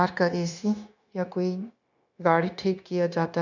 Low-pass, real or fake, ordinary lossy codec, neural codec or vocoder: 7.2 kHz; fake; none; codec, 16 kHz, 0.9 kbps, LongCat-Audio-Codec